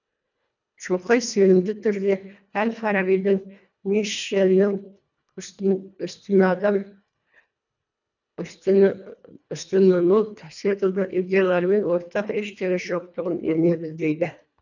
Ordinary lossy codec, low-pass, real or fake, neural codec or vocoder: none; 7.2 kHz; fake; codec, 24 kHz, 1.5 kbps, HILCodec